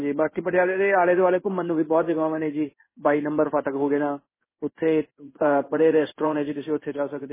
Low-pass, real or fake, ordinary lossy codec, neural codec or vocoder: 3.6 kHz; fake; MP3, 16 kbps; codec, 16 kHz in and 24 kHz out, 1 kbps, XY-Tokenizer